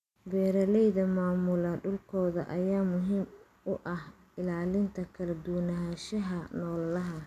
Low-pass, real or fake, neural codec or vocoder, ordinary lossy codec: 14.4 kHz; real; none; none